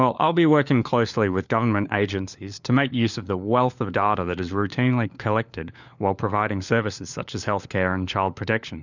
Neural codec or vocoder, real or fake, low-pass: codec, 16 kHz, 4 kbps, FunCodec, trained on LibriTTS, 50 frames a second; fake; 7.2 kHz